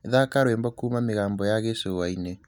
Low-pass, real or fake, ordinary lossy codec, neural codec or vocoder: 19.8 kHz; real; none; none